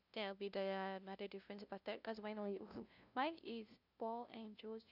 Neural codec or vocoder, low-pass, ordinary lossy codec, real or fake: codec, 16 kHz, 0.5 kbps, FunCodec, trained on LibriTTS, 25 frames a second; 5.4 kHz; none; fake